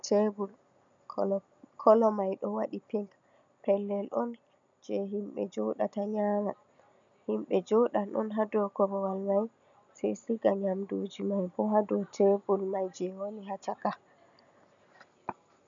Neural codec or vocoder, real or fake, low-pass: none; real; 7.2 kHz